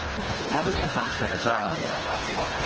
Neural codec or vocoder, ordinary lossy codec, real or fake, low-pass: codec, 24 kHz, 1.5 kbps, HILCodec; Opus, 16 kbps; fake; 7.2 kHz